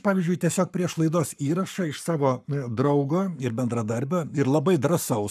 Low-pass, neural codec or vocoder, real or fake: 14.4 kHz; codec, 44.1 kHz, 7.8 kbps, Pupu-Codec; fake